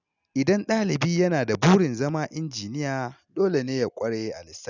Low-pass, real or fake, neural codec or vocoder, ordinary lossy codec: 7.2 kHz; real; none; none